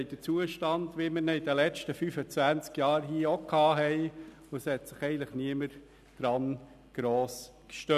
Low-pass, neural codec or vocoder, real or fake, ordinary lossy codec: 14.4 kHz; none; real; none